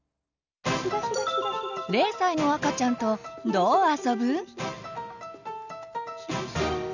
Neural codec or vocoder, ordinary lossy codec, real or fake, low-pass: none; none; real; 7.2 kHz